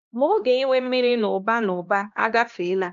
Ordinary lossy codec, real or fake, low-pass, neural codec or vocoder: MP3, 64 kbps; fake; 7.2 kHz; codec, 16 kHz, 1 kbps, X-Codec, HuBERT features, trained on LibriSpeech